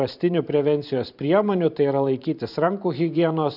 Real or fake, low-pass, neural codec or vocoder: fake; 5.4 kHz; vocoder, 44.1 kHz, 128 mel bands every 512 samples, BigVGAN v2